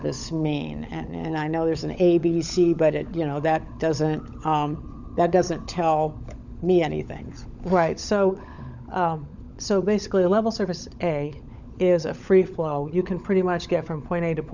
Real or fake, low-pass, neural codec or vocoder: fake; 7.2 kHz; codec, 16 kHz, 16 kbps, FunCodec, trained on LibriTTS, 50 frames a second